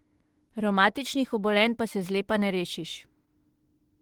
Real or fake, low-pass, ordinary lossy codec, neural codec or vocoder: fake; 19.8 kHz; Opus, 16 kbps; autoencoder, 48 kHz, 32 numbers a frame, DAC-VAE, trained on Japanese speech